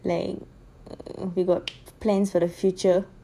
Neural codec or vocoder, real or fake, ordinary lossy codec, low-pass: vocoder, 48 kHz, 128 mel bands, Vocos; fake; none; 14.4 kHz